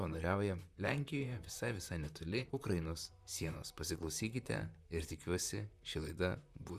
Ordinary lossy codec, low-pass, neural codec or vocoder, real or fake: Opus, 64 kbps; 14.4 kHz; vocoder, 44.1 kHz, 128 mel bands, Pupu-Vocoder; fake